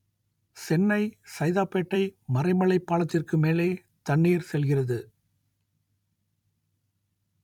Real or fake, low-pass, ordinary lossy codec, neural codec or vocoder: fake; 19.8 kHz; none; vocoder, 44.1 kHz, 128 mel bands every 256 samples, BigVGAN v2